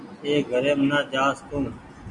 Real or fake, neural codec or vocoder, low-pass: real; none; 10.8 kHz